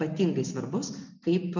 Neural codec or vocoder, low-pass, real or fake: none; 7.2 kHz; real